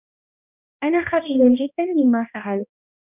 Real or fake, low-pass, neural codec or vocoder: fake; 3.6 kHz; codec, 16 kHz, 1 kbps, X-Codec, HuBERT features, trained on balanced general audio